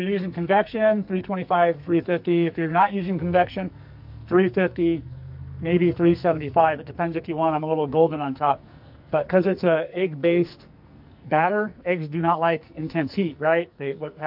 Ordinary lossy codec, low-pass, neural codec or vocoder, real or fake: MP3, 48 kbps; 5.4 kHz; codec, 44.1 kHz, 2.6 kbps, SNAC; fake